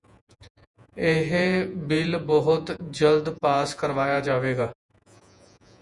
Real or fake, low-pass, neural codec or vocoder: fake; 10.8 kHz; vocoder, 48 kHz, 128 mel bands, Vocos